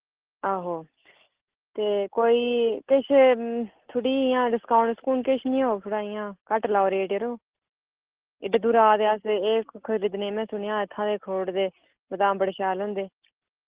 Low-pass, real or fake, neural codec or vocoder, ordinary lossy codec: 3.6 kHz; real; none; Opus, 16 kbps